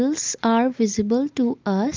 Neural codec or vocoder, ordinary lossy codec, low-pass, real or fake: none; Opus, 24 kbps; 7.2 kHz; real